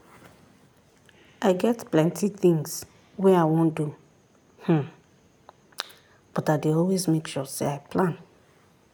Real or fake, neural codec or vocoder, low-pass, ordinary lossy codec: real; none; none; none